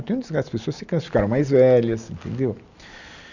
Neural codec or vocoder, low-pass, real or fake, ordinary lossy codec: none; 7.2 kHz; real; none